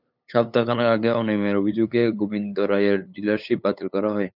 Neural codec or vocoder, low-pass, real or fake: codec, 16 kHz, 8 kbps, FunCodec, trained on LibriTTS, 25 frames a second; 5.4 kHz; fake